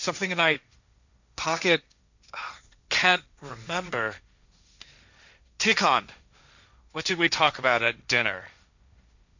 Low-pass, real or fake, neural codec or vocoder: 7.2 kHz; fake; codec, 16 kHz, 1.1 kbps, Voila-Tokenizer